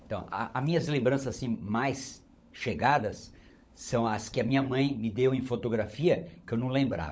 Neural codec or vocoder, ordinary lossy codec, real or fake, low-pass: codec, 16 kHz, 16 kbps, FunCodec, trained on LibriTTS, 50 frames a second; none; fake; none